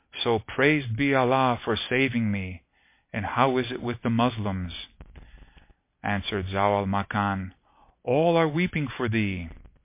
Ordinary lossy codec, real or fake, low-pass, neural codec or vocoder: MP3, 24 kbps; real; 3.6 kHz; none